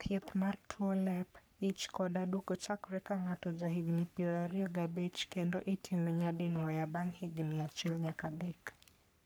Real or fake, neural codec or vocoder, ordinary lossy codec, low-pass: fake; codec, 44.1 kHz, 3.4 kbps, Pupu-Codec; none; none